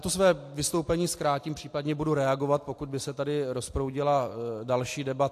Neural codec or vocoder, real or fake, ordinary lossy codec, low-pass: none; real; AAC, 64 kbps; 14.4 kHz